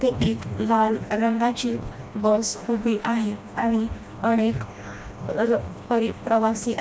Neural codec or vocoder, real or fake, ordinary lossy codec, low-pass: codec, 16 kHz, 1 kbps, FreqCodec, smaller model; fake; none; none